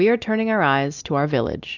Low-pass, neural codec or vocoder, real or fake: 7.2 kHz; none; real